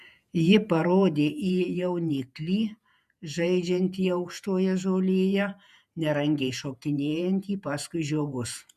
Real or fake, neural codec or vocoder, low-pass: fake; vocoder, 48 kHz, 128 mel bands, Vocos; 14.4 kHz